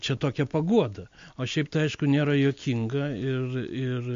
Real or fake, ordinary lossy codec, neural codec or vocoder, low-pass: real; MP3, 48 kbps; none; 7.2 kHz